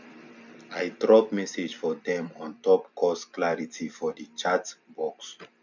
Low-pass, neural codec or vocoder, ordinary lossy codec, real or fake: 7.2 kHz; vocoder, 44.1 kHz, 128 mel bands every 256 samples, BigVGAN v2; none; fake